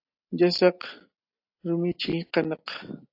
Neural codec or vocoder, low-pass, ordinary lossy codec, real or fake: none; 5.4 kHz; AAC, 32 kbps; real